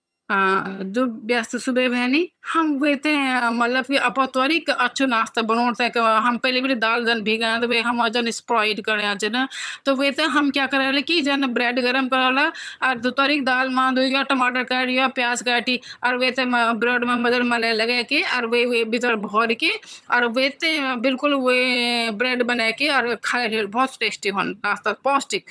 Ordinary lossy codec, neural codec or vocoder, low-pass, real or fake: none; vocoder, 22.05 kHz, 80 mel bands, HiFi-GAN; none; fake